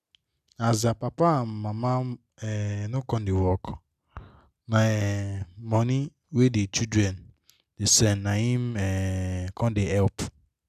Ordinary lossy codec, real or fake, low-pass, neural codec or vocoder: none; real; 14.4 kHz; none